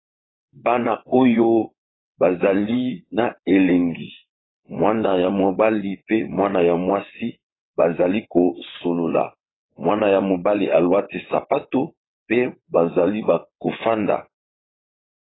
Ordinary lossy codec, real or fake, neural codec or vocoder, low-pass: AAC, 16 kbps; fake; vocoder, 22.05 kHz, 80 mel bands, WaveNeXt; 7.2 kHz